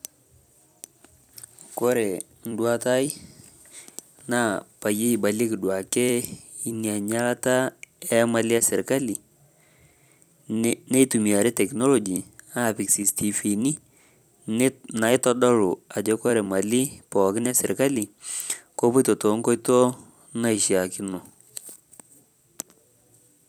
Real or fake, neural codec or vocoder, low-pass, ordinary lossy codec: fake; vocoder, 44.1 kHz, 128 mel bands every 256 samples, BigVGAN v2; none; none